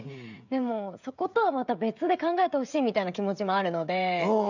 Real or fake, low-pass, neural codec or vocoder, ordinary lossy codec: fake; 7.2 kHz; codec, 16 kHz, 16 kbps, FreqCodec, smaller model; none